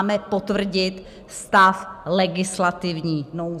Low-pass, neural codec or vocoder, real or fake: 14.4 kHz; none; real